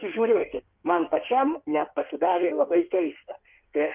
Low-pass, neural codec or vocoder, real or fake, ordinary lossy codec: 3.6 kHz; codec, 16 kHz in and 24 kHz out, 1.1 kbps, FireRedTTS-2 codec; fake; Opus, 32 kbps